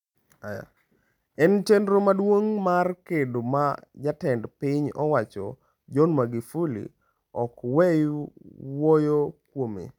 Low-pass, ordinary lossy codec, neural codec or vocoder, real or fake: 19.8 kHz; none; none; real